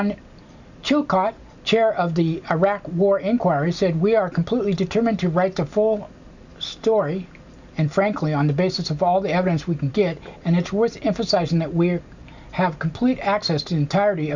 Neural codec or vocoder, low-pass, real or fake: none; 7.2 kHz; real